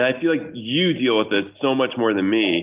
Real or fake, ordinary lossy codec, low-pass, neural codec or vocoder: real; Opus, 24 kbps; 3.6 kHz; none